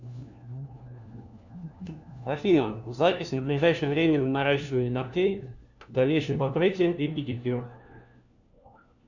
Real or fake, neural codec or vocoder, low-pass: fake; codec, 16 kHz, 1 kbps, FunCodec, trained on LibriTTS, 50 frames a second; 7.2 kHz